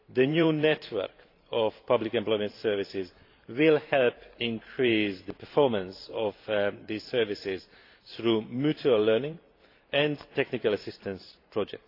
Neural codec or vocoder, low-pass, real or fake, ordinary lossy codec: vocoder, 44.1 kHz, 128 mel bands every 512 samples, BigVGAN v2; 5.4 kHz; fake; AAC, 32 kbps